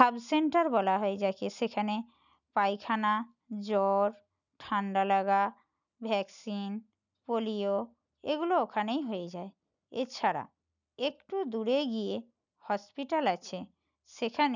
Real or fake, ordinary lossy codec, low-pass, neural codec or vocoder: real; none; 7.2 kHz; none